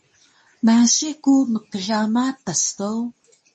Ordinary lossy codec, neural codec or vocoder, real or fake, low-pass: MP3, 32 kbps; codec, 24 kHz, 0.9 kbps, WavTokenizer, medium speech release version 2; fake; 10.8 kHz